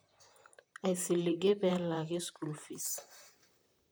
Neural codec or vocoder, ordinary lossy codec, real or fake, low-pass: vocoder, 44.1 kHz, 128 mel bands, Pupu-Vocoder; none; fake; none